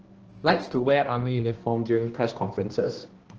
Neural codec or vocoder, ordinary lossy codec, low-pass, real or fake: codec, 16 kHz, 1 kbps, X-Codec, HuBERT features, trained on balanced general audio; Opus, 16 kbps; 7.2 kHz; fake